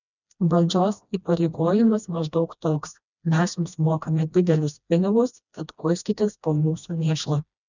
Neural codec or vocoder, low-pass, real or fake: codec, 16 kHz, 1 kbps, FreqCodec, smaller model; 7.2 kHz; fake